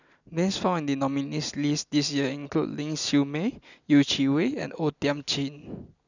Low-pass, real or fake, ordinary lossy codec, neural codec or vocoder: 7.2 kHz; real; none; none